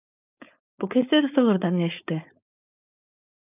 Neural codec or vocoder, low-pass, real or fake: codec, 16 kHz, 4.8 kbps, FACodec; 3.6 kHz; fake